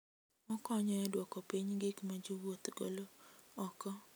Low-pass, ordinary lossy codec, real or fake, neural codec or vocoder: none; none; real; none